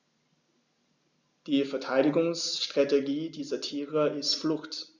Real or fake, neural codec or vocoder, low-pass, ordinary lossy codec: real; none; 7.2 kHz; Opus, 64 kbps